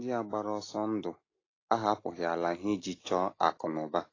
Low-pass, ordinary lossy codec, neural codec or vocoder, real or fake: 7.2 kHz; AAC, 32 kbps; none; real